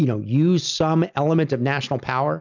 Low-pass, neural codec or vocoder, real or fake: 7.2 kHz; none; real